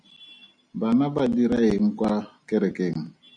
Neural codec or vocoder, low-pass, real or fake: none; 9.9 kHz; real